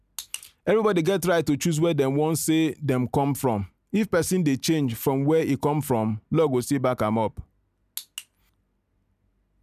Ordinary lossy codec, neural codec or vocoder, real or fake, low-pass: none; none; real; 14.4 kHz